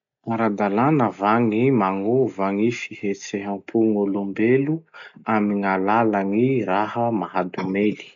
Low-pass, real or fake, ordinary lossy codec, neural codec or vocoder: 7.2 kHz; real; none; none